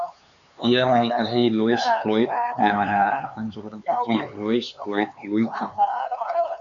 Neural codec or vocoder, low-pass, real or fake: codec, 16 kHz, 4 kbps, X-Codec, HuBERT features, trained on LibriSpeech; 7.2 kHz; fake